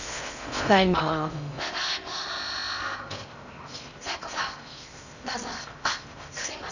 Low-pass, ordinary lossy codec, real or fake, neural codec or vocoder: 7.2 kHz; none; fake; codec, 16 kHz in and 24 kHz out, 0.6 kbps, FocalCodec, streaming, 4096 codes